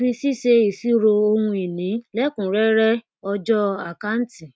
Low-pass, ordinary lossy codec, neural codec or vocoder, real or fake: none; none; none; real